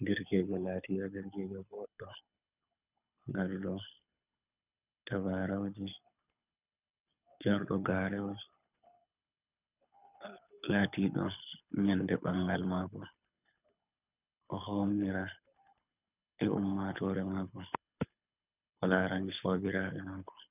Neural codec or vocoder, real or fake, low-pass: codec, 24 kHz, 6 kbps, HILCodec; fake; 3.6 kHz